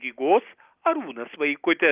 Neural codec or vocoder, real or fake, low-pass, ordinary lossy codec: none; real; 3.6 kHz; Opus, 24 kbps